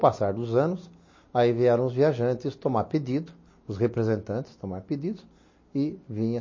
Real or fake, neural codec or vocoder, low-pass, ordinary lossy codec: real; none; 7.2 kHz; MP3, 32 kbps